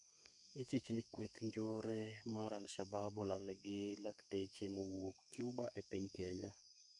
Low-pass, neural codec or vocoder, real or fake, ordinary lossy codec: 10.8 kHz; codec, 32 kHz, 1.9 kbps, SNAC; fake; none